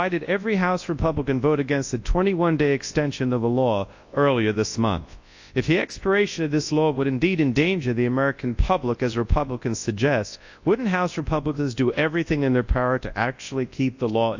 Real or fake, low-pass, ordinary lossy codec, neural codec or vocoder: fake; 7.2 kHz; AAC, 48 kbps; codec, 24 kHz, 0.9 kbps, WavTokenizer, large speech release